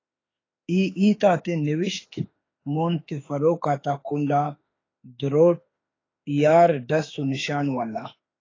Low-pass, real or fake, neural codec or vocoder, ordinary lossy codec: 7.2 kHz; fake; autoencoder, 48 kHz, 32 numbers a frame, DAC-VAE, trained on Japanese speech; AAC, 32 kbps